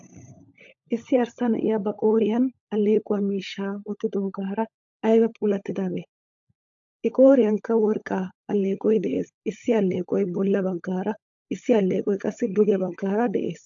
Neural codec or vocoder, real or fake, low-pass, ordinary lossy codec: codec, 16 kHz, 16 kbps, FunCodec, trained on LibriTTS, 50 frames a second; fake; 7.2 kHz; AAC, 64 kbps